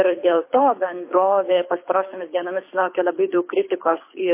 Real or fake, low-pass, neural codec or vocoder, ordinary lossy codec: fake; 3.6 kHz; codec, 24 kHz, 6 kbps, HILCodec; MP3, 24 kbps